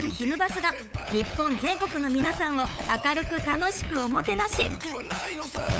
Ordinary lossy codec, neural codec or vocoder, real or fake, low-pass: none; codec, 16 kHz, 8 kbps, FunCodec, trained on LibriTTS, 25 frames a second; fake; none